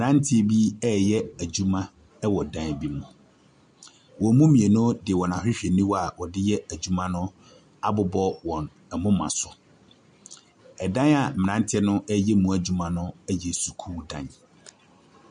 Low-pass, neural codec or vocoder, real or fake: 9.9 kHz; none; real